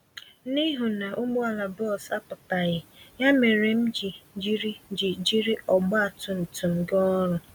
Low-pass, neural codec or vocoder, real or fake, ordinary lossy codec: 19.8 kHz; none; real; none